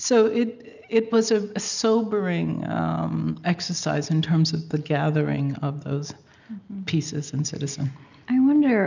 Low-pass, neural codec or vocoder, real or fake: 7.2 kHz; none; real